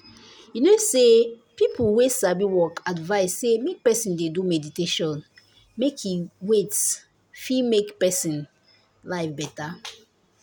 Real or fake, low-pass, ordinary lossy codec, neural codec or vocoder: real; none; none; none